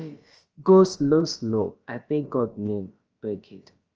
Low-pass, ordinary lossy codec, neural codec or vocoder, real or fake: 7.2 kHz; Opus, 24 kbps; codec, 16 kHz, about 1 kbps, DyCAST, with the encoder's durations; fake